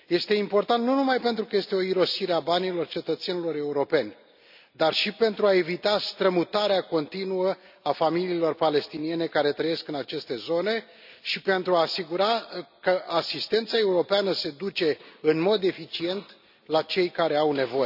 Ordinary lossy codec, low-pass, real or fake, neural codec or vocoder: none; 5.4 kHz; real; none